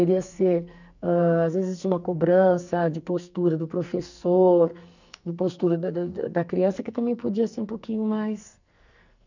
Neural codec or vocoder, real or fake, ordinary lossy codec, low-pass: codec, 44.1 kHz, 2.6 kbps, SNAC; fake; none; 7.2 kHz